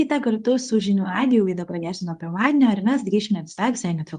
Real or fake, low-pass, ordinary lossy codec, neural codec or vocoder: fake; 10.8 kHz; Opus, 32 kbps; codec, 24 kHz, 0.9 kbps, WavTokenizer, medium speech release version 2